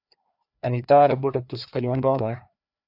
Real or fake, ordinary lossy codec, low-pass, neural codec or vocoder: fake; AAC, 48 kbps; 5.4 kHz; codec, 16 kHz, 2 kbps, FreqCodec, larger model